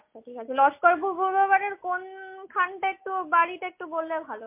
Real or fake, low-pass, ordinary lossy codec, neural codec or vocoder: real; 3.6 kHz; MP3, 24 kbps; none